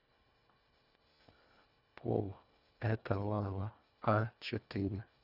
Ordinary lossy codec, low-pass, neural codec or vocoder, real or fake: none; 5.4 kHz; codec, 24 kHz, 1.5 kbps, HILCodec; fake